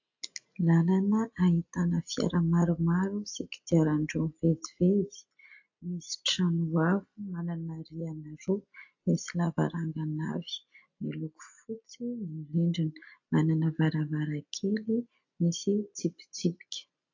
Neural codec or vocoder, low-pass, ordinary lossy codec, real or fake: none; 7.2 kHz; AAC, 48 kbps; real